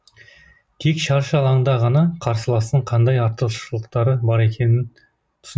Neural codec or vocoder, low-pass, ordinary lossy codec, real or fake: none; none; none; real